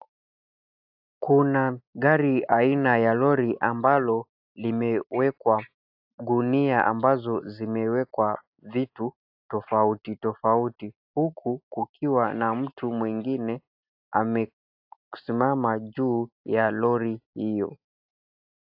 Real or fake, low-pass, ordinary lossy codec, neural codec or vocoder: real; 5.4 kHz; AAC, 48 kbps; none